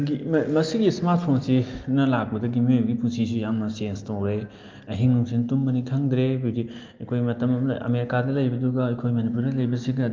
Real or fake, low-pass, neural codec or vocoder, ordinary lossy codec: real; 7.2 kHz; none; Opus, 24 kbps